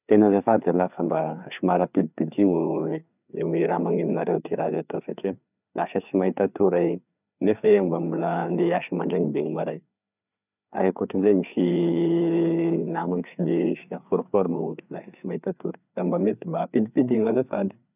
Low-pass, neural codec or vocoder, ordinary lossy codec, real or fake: 3.6 kHz; codec, 16 kHz, 4 kbps, FreqCodec, larger model; none; fake